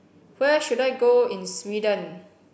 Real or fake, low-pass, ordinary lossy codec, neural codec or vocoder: real; none; none; none